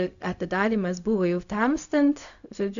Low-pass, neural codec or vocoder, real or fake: 7.2 kHz; codec, 16 kHz, 0.4 kbps, LongCat-Audio-Codec; fake